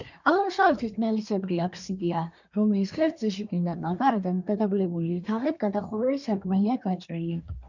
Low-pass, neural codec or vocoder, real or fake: 7.2 kHz; codec, 24 kHz, 1 kbps, SNAC; fake